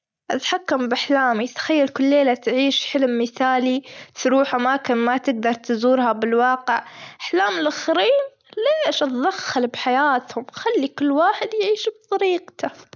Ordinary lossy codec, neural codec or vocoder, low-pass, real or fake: none; none; none; real